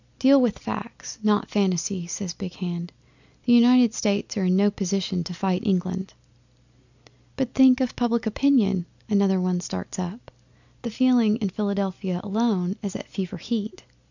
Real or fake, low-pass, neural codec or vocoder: real; 7.2 kHz; none